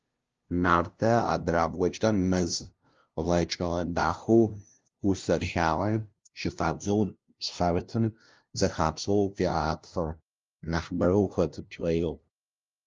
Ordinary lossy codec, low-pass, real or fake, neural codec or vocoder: Opus, 32 kbps; 7.2 kHz; fake; codec, 16 kHz, 0.5 kbps, FunCodec, trained on LibriTTS, 25 frames a second